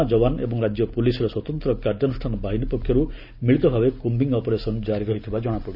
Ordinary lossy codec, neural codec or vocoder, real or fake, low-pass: none; none; real; 5.4 kHz